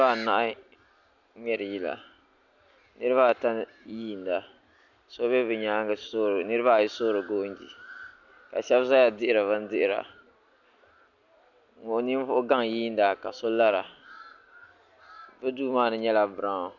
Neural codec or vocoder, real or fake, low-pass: none; real; 7.2 kHz